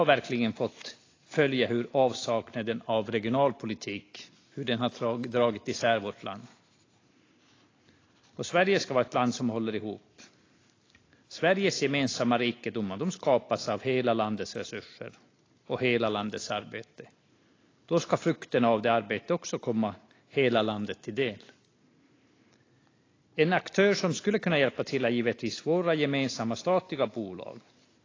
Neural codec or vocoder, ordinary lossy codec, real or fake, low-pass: none; AAC, 32 kbps; real; 7.2 kHz